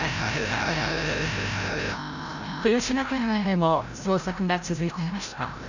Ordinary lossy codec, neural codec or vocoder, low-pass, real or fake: none; codec, 16 kHz, 0.5 kbps, FreqCodec, larger model; 7.2 kHz; fake